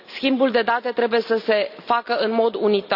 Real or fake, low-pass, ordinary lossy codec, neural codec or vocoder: real; 5.4 kHz; none; none